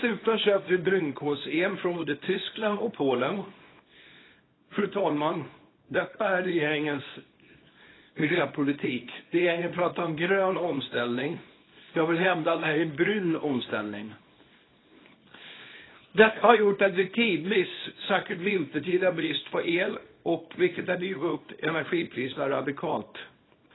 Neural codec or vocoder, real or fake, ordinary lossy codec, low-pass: codec, 24 kHz, 0.9 kbps, WavTokenizer, small release; fake; AAC, 16 kbps; 7.2 kHz